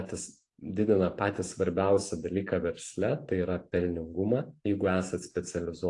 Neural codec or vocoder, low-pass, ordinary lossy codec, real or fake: vocoder, 44.1 kHz, 128 mel bands every 512 samples, BigVGAN v2; 10.8 kHz; AAC, 48 kbps; fake